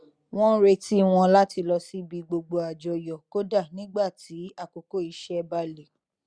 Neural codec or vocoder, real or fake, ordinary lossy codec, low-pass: none; real; Opus, 64 kbps; 9.9 kHz